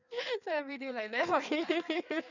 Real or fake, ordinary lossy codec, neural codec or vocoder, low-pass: fake; none; codec, 16 kHz in and 24 kHz out, 1.1 kbps, FireRedTTS-2 codec; 7.2 kHz